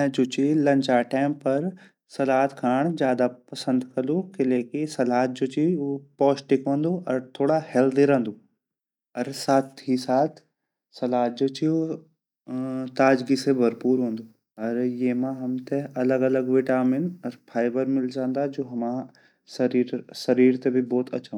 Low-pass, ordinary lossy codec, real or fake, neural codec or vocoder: 19.8 kHz; none; real; none